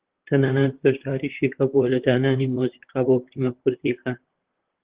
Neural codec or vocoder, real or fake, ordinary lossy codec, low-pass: vocoder, 44.1 kHz, 128 mel bands, Pupu-Vocoder; fake; Opus, 16 kbps; 3.6 kHz